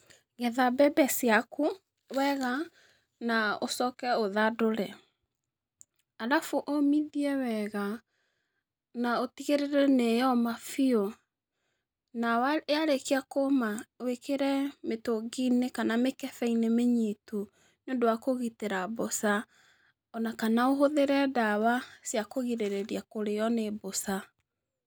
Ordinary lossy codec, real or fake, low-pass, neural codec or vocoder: none; real; none; none